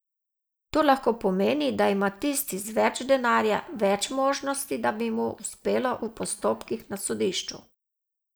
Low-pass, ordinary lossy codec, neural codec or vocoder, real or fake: none; none; none; real